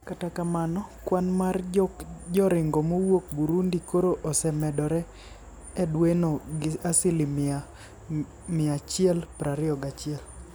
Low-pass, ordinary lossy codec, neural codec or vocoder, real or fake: none; none; none; real